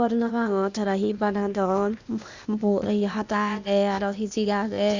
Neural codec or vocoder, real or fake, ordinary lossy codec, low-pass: codec, 16 kHz, 0.8 kbps, ZipCodec; fake; Opus, 64 kbps; 7.2 kHz